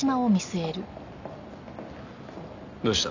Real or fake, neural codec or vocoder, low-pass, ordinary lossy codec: real; none; 7.2 kHz; none